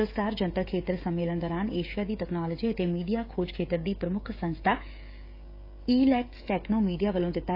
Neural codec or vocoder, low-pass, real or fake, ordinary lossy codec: codec, 16 kHz, 16 kbps, FreqCodec, smaller model; 5.4 kHz; fake; AAC, 32 kbps